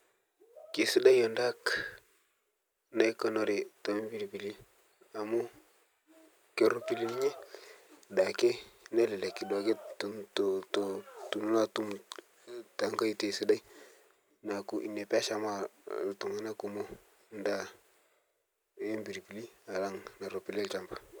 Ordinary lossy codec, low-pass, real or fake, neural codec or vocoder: none; none; real; none